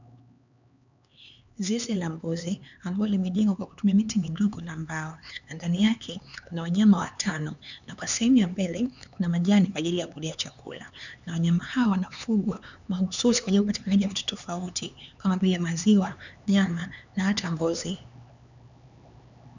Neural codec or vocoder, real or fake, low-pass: codec, 16 kHz, 4 kbps, X-Codec, HuBERT features, trained on LibriSpeech; fake; 7.2 kHz